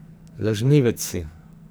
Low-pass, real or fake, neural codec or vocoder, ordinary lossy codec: none; fake; codec, 44.1 kHz, 2.6 kbps, SNAC; none